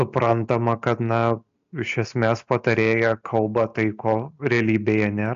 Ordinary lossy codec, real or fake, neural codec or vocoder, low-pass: AAC, 64 kbps; real; none; 7.2 kHz